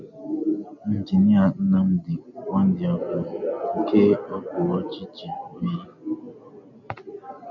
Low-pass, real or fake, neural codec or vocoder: 7.2 kHz; real; none